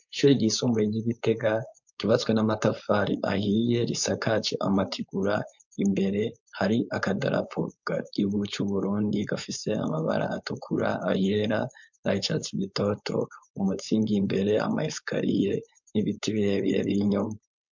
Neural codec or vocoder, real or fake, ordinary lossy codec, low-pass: codec, 16 kHz, 4.8 kbps, FACodec; fake; MP3, 64 kbps; 7.2 kHz